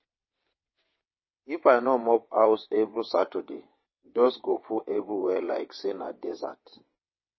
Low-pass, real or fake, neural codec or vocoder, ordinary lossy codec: 7.2 kHz; fake; vocoder, 22.05 kHz, 80 mel bands, WaveNeXt; MP3, 24 kbps